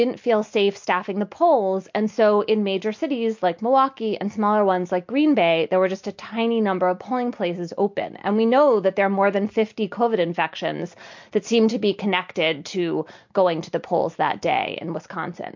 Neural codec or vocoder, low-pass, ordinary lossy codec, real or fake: none; 7.2 kHz; MP3, 48 kbps; real